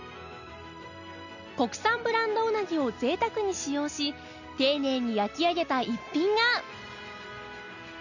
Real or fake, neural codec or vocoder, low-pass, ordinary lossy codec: real; none; 7.2 kHz; none